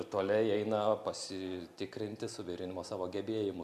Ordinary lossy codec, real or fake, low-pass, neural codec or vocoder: MP3, 96 kbps; real; 14.4 kHz; none